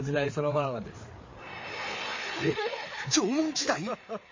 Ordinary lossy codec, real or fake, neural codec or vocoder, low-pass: MP3, 32 kbps; fake; codec, 16 kHz, 4 kbps, FreqCodec, larger model; 7.2 kHz